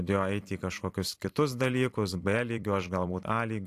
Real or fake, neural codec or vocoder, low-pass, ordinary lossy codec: fake; vocoder, 44.1 kHz, 128 mel bands every 256 samples, BigVGAN v2; 14.4 kHz; AAC, 64 kbps